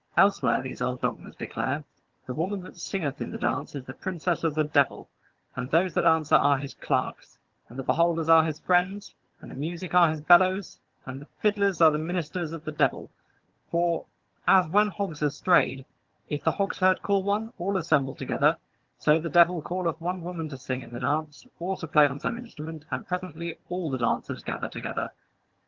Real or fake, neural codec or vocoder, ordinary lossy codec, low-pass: fake; vocoder, 22.05 kHz, 80 mel bands, HiFi-GAN; Opus, 16 kbps; 7.2 kHz